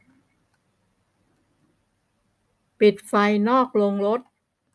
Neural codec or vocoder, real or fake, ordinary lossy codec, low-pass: none; real; none; none